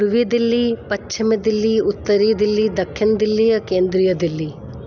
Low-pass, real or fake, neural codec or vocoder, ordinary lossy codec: none; real; none; none